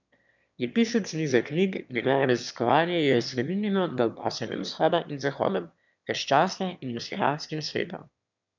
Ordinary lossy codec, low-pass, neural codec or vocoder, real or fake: none; 7.2 kHz; autoencoder, 22.05 kHz, a latent of 192 numbers a frame, VITS, trained on one speaker; fake